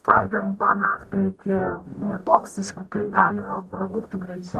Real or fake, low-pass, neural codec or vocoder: fake; 14.4 kHz; codec, 44.1 kHz, 0.9 kbps, DAC